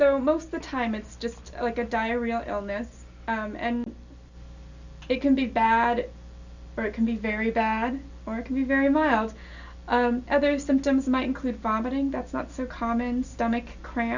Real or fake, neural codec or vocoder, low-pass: real; none; 7.2 kHz